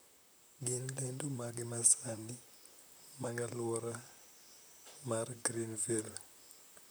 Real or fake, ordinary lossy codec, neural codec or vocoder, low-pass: fake; none; vocoder, 44.1 kHz, 128 mel bands, Pupu-Vocoder; none